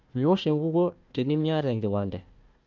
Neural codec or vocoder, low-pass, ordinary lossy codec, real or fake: codec, 16 kHz, 1 kbps, FunCodec, trained on Chinese and English, 50 frames a second; 7.2 kHz; Opus, 24 kbps; fake